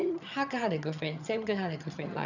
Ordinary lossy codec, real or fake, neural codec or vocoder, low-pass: none; fake; vocoder, 22.05 kHz, 80 mel bands, HiFi-GAN; 7.2 kHz